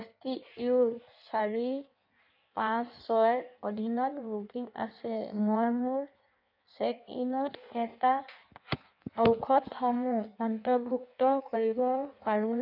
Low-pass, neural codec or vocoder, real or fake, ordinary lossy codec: 5.4 kHz; codec, 16 kHz in and 24 kHz out, 1.1 kbps, FireRedTTS-2 codec; fake; none